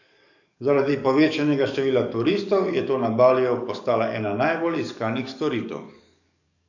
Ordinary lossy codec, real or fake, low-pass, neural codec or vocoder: none; fake; 7.2 kHz; codec, 44.1 kHz, 7.8 kbps, DAC